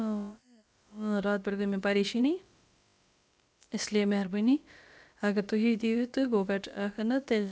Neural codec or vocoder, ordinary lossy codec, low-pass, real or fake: codec, 16 kHz, about 1 kbps, DyCAST, with the encoder's durations; none; none; fake